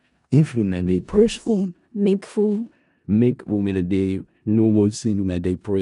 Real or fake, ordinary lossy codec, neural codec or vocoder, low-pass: fake; none; codec, 16 kHz in and 24 kHz out, 0.4 kbps, LongCat-Audio-Codec, four codebook decoder; 10.8 kHz